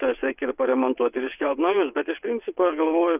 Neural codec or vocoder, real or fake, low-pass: vocoder, 22.05 kHz, 80 mel bands, WaveNeXt; fake; 3.6 kHz